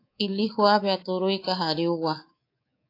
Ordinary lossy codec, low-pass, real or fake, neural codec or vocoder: AAC, 32 kbps; 5.4 kHz; fake; codec, 24 kHz, 3.1 kbps, DualCodec